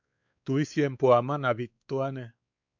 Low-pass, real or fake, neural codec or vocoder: 7.2 kHz; fake; codec, 16 kHz, 4 kbps, X-Codec, WavLM features, trained on Multilingual LibriSpeech